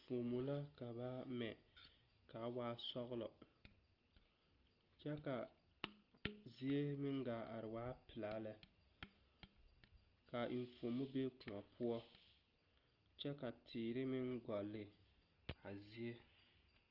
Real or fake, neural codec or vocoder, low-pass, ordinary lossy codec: real; none; 5.4 kHz; Opus, 32 kbps